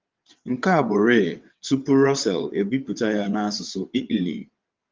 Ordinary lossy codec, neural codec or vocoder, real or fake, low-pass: Opus, 32 kbps; vocoder, 22.05 kHz, 80 mel bands, WaveNeXt; fake; 7.2 kHz